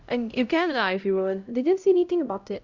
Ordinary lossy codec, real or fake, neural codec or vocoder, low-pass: Opus, 64 kbps; fake; codec, 16 kHz, 0.5 kbps, X-Codec, HuBERT features, trained on LibriSpeech; 7.2 kHz